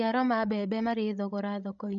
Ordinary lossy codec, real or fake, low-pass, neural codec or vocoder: MP3, 96 kbps; fake; 7.2 kHz; codec, 16 kHz, 16 kbps, FreqCodec, larger model